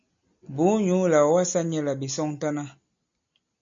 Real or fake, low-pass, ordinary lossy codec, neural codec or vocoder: real; 7.2 kHz; MP3, 48 kbps; none